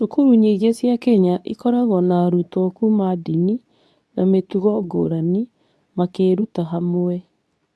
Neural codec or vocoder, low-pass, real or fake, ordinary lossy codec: codec, 24 kHz, 0.9 kbps, WavTokenizer, medium speech release version 2; none; fake; none